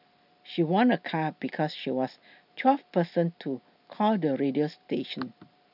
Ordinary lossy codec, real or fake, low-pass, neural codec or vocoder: none; real; 5.4 kHz; none